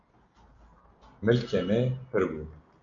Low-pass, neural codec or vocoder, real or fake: 7.2 kHz; none; real